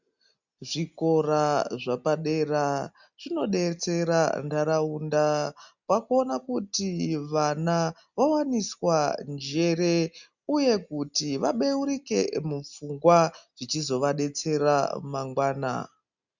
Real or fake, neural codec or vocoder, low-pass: real; none; 7.2 kHz